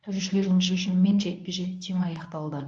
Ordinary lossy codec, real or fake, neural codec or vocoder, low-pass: AAC, 64 kbps; fake; codec, 24 kHz, 0.9 kbps, WavTokenizer, medium speech release version 1; 9.9 kHz